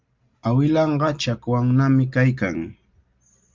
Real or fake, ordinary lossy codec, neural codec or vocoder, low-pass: real; Opus, 32 kbps; none; 7.2 kHz